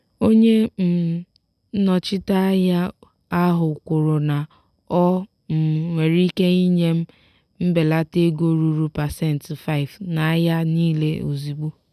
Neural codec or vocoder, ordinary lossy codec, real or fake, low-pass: none; none; real; 14.4 kHz